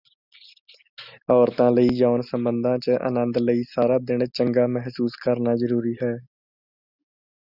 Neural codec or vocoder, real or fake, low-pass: none; real; 5.4 kHz